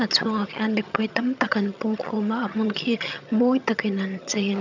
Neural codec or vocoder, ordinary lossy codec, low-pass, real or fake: vocoder, 22.05 kHz, 80 mel bands, HiFi-GAN; none; 7.2 kHz; fake